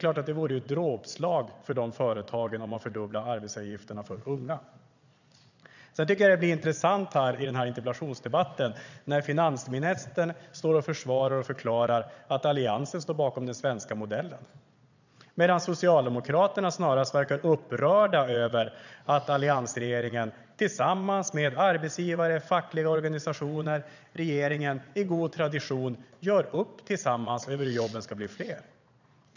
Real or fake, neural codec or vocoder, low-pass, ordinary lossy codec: fake; vocoder, 22.05 kHz, 80 mel bands, Vocos; 7.2 kHz; none